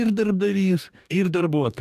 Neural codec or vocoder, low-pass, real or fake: codec, 44.1 kHz, 2.6 kbps, DAC; 14.4 kHz; fake